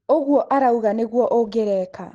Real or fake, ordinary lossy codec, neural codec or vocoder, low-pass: real; Opus, 16 kbps; none; 10.8 kHz